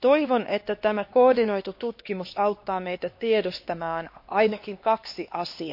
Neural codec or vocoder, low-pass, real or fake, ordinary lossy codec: codec, 16 kHz, 2 kbps, X-Codec, HuBERT features, trained on LibriSpeech; 5.4 kHz; fake; MP3, 32 kbps